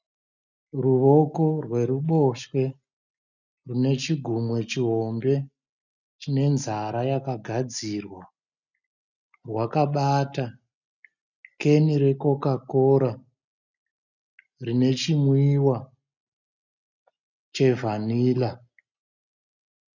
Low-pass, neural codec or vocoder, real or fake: 7.2 kHz; none; real